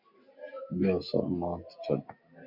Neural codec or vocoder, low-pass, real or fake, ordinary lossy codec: none; 5.4 kHz; real; Opus, 64 kbps